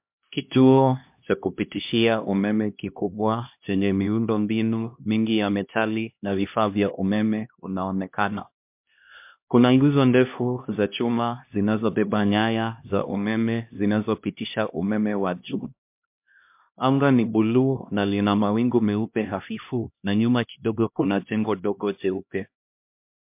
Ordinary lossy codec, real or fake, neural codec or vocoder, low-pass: MP3, 32 kbps; fake; codec, 16 kHz, 1 kbps, X-Codec, HuBERT features, trained on LibriSpeech; 3.6 kHz